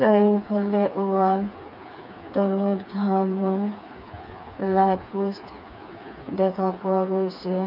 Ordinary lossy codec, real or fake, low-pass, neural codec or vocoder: none; fake; 5.4 kHz; codec, 16 kHz, 4 kbps, FreqCodec, smaller model